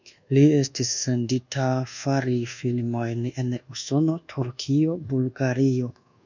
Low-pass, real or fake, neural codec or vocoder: 7.2 kHz; fake; codec, 24 kHz, 1.2 kbps, DualCodec